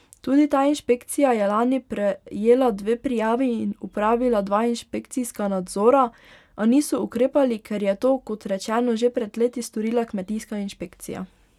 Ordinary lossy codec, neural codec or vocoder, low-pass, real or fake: none; none; 19.8 kHz; real